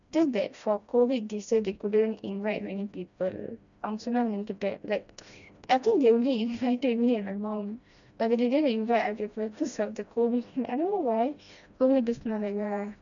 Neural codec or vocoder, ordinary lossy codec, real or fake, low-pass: codec, 16 kHz, 1 kbps, FreqCodec, smaller model; none; fake; 7.2 kHz